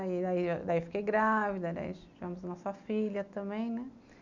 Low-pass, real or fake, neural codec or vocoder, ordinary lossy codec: 7.2 kHz; real; none; none